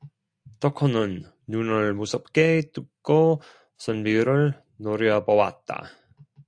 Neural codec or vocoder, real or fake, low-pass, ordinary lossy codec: none; real; 9.9 kHz; AAC, 64 kbps